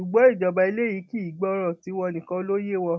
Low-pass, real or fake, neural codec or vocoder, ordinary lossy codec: none; real; none; none